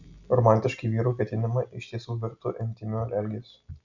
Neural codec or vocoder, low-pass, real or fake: none; 7.2 kHz; real